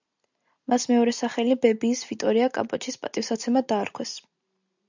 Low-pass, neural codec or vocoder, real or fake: 7.2 kHz; none; real